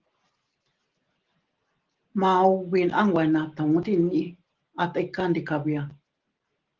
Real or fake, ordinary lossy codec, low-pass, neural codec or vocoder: real; Opus, 16 kbps; 7.2 kHz; none